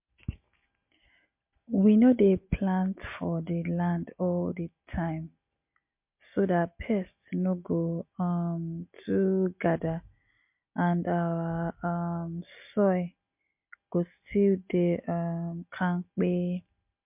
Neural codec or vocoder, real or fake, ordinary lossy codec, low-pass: none; real; MP3, 32 kbps; 3.6 kHz